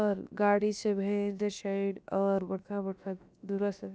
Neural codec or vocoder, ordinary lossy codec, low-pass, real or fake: codec, 16 kHz, about 1 kbps, DyCAST, with the encoder's durations; none; none; fake